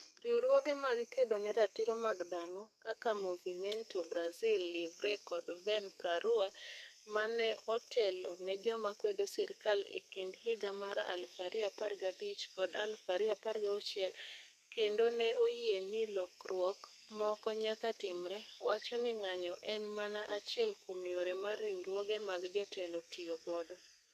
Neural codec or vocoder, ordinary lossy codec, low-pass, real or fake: codec, 32 kHz, 1.9 kbps, SNAC; none; 14.4 kHz; fake